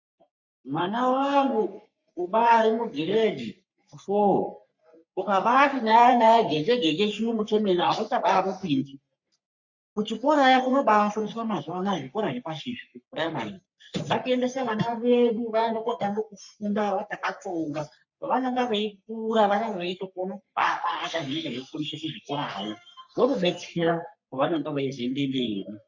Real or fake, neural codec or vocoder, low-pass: fake; codec, 44.1 kHz, 3.4 kbps, Pupu-Codec; 7.2 kHz